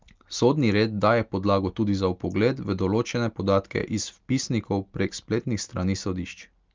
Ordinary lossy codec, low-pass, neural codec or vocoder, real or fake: Opus, 32 kbps; 7.2 kHz; none; real